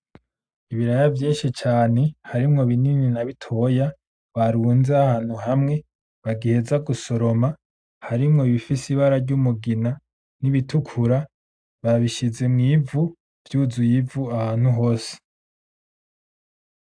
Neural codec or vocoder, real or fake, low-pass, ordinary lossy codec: none; real; 9.9 kHz; AAC, 64 kbps